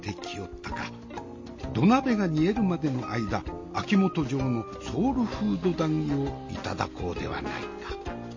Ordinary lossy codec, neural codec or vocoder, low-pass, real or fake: MP3, 32 kbps; none; 7.2 kHz; real